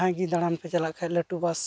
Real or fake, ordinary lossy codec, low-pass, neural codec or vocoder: real; none; none; none